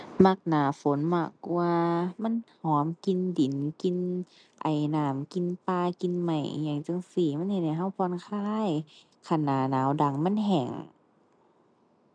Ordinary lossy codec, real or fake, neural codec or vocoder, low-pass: none; real; none; 9.9 kHz